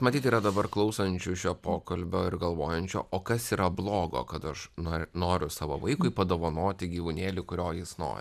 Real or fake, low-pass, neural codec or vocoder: real; 14.4 kHz; none